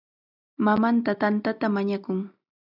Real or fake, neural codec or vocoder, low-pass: real; none; 5.4 kHz